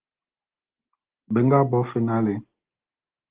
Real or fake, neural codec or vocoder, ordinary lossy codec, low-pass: real; none; Opus, 16 kbps; 3.6 kHz